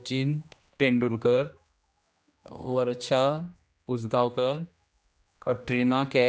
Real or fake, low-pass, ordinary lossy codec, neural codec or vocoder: fake; none; none; codec, 16 kHz, 1 kbps, X-Codec, HuBERT features, trained on general audio